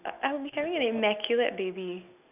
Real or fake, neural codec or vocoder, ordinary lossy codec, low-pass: real; none; none; 3.6 kHz